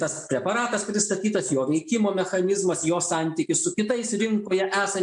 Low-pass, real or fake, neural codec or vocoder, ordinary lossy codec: 10.8 kHz; real; none; MP3, 64 kbps